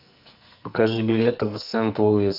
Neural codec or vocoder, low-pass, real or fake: codec, 44.1 kHz, 2.6 kbps, SNAC; 5.4 kHz; fake